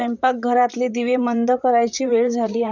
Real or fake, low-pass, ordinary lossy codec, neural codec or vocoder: fake; 7.2 kHz; none; vocoder, 44.1 kHz, 128 mel bands, Pupu-Vocoder